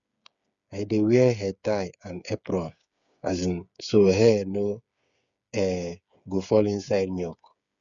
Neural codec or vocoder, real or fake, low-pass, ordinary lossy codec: codec, 16 kHz, 8 kbps, FreqCodec, smaller model; fake; 7.2 kHz; none